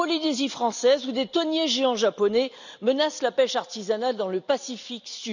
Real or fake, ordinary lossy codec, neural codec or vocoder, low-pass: real; none; none; 7.2 kHz